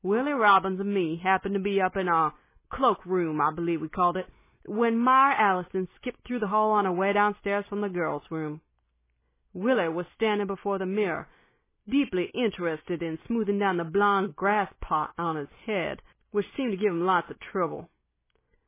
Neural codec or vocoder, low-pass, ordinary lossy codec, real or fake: none; 3.6 kHz; MP3, 16 kbps; real